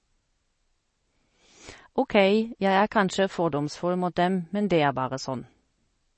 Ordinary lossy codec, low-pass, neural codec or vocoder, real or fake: MP3, 32 kbps; 10.8 kHz; none; real